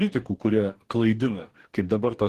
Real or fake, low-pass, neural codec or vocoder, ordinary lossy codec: fake; 14.4 kHz; codec, 44.1 kHz, 2.6 kbps, DAC; Opus, 16 kbps